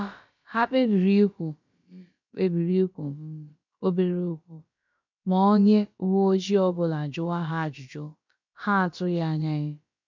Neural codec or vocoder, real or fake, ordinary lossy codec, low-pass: codec, 16 kHz, about 1 kbps, DyCAST, with the encoder's durations; fake; MP3, 64 kbps; 7.2 kHz